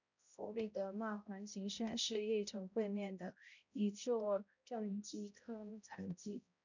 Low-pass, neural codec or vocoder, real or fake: 7.2 kHz; codec, 16 kHz, 0.5 kbps, X-Codec, HuBERT features, trained on balanced general audio; fake